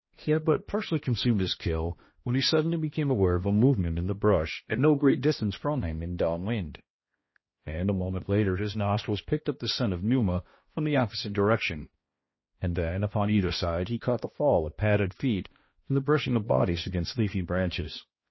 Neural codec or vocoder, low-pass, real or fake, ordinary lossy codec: codec, 16 kHz, 1 kbps, X-Codec, HuBERT features, trained on balanced general audio; 7.2 kHz; fake; MP3, 24 kbps